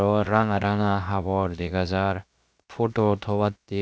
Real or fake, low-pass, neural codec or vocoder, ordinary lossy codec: fake; none; codec, 16 kHz, 0.7 kbps, FocalCodec; none